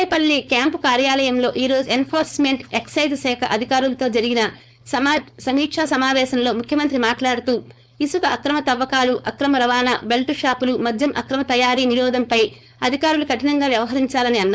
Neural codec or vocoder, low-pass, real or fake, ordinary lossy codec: codec, 16 kHz, 4.8 kbps, FACodec; none; fake; none